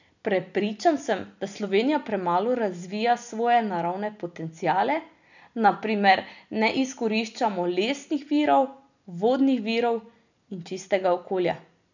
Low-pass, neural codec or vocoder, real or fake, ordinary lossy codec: 7.2 kHz; none; real; none